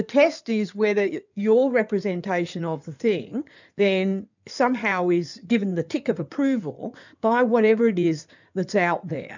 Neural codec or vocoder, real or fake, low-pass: codec, 16 kHz in and 24 kHz out, 2.2 kbps, FireRedTTS-2 codec; fake; 7.2 kHz